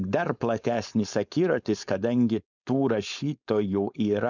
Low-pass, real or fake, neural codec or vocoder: 7.2 kHz; fake; codec, 16 kHz, 4.8 kbps, FACodec